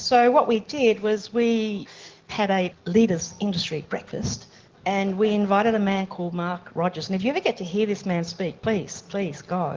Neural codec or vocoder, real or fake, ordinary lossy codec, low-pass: none; real; Opus, 16 kbps; 7.2 kHz